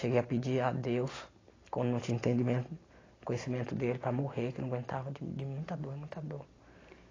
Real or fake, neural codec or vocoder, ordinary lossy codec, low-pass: real; none; AAC, 32 kbps; 7.2 kHz